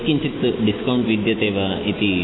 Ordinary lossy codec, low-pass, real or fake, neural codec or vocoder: AAC, 16 kbps; 7.2 kHz; real; none